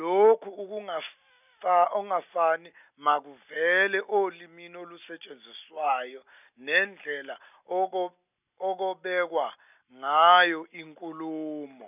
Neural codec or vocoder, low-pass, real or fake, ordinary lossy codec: none; 3.6 kHz; real; none